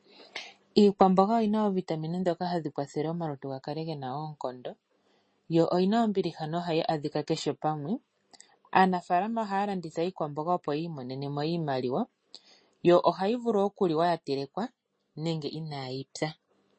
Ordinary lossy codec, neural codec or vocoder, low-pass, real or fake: MP3, 32 kbps; none; 9.9 kHz; real